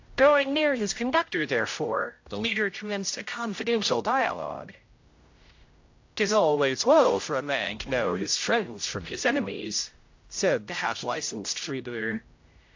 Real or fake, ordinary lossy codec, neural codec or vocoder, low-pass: fake; AAC, 48 kbps; codec, 16 kHz, 0.5 kbps, X-Codec, HuBERT features, trained on general audio; 7.2 kHz